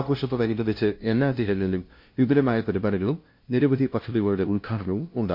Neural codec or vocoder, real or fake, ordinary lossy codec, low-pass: codec, 16 kHz, 0.5 kbps, FunCodec, trained on LibriTTS, 25 frames a second; fake; MP3, 32 kbps; 5.4 kHz